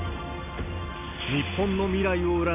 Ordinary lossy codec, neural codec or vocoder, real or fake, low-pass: none; none; real; 3.6 kHz